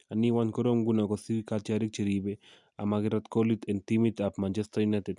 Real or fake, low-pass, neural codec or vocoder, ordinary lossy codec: real; 10.8 kHz; none; none